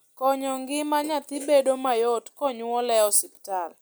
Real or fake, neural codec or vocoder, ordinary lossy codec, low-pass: real; none; none; none